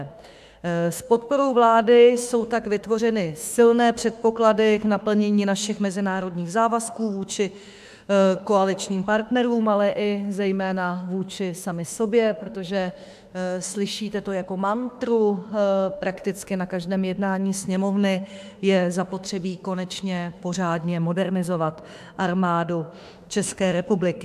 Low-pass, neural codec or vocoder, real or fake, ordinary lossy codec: 14.4 kHz; autoencoder, 48 kHz, 32 numbers a frame, DAC-VAE, trained on Japanese speech; fake; AAC, 96 kbps